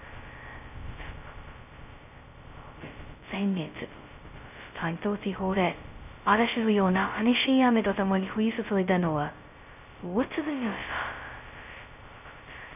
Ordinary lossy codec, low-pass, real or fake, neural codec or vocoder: none; 3.6 kHz; fake; codec, 16 kHz, 0.2 kbps, FocalCodec